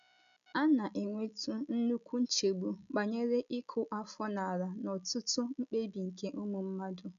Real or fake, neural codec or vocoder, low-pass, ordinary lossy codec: real; none; 7.2 kHz; none